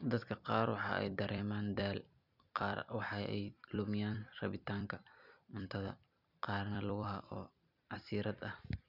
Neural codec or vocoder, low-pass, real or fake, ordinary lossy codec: vocoder, 44.1 kHz, 128 mel bands every 256 samples, BigVGAN v2; 5.4 kHz; fake; none